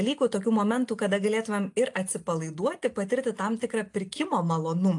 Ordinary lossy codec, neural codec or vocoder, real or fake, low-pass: AAC, 64 kbps; none; real; 10.8 kHz